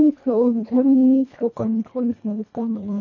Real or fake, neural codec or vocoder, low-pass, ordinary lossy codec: fake; codec, 24 kHz, 1.5 kbps, HILCodec; 7.2 kHz; none